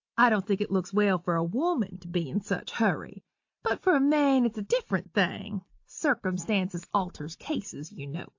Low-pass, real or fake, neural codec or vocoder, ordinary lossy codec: 7.2 kHz; real; none; AAC, 48 kbps